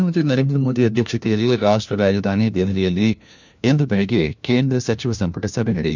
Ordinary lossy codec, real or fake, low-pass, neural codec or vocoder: none; fake; 7.2 kHz; codec, 16 kHz, 1 kbps, FunCodec, trained on LibriTTS, 50 frames a second